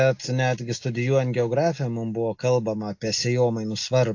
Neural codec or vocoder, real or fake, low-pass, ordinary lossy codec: none; real; 7.2 kHz; AAC, 48 kbps